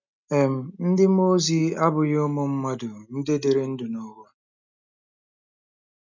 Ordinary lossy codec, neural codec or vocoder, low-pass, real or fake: none; none; 7.2 kHz; real